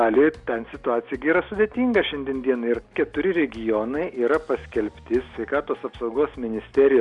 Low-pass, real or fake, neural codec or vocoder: 10.8 kHz; real; none